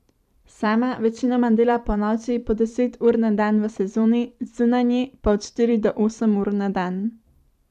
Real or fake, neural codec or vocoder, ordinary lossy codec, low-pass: fake; vocoder, 44.1 kHz, 128 mel bands every 512 samples, BigVGAN v2; none; 14.4 kHz